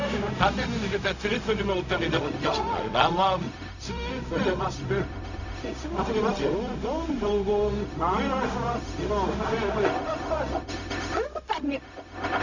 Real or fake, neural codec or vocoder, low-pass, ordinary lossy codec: fake; codec, 16 kHz, 0.4 kbps, LongCat-Audio-Codec; 7.2 kHz; none